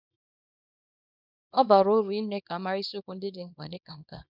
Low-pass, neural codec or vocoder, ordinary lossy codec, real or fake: 5.4 kHz; codec, 24 kHz, 0.9 kbps, WavTokenizer, small release; none; fake